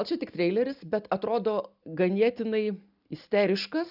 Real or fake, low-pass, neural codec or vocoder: real; 5.4 kHz; none